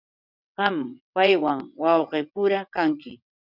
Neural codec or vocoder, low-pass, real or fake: vocoder, 22.05 kHz, 80 mel bands, WaveNeXt; 5.4 kHz; fake